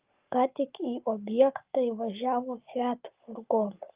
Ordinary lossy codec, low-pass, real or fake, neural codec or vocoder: Opus, 32 kbps; 3.6 kHz; fake; codec, 44.1 kHz, 7.8 kbps, Pupu-Codec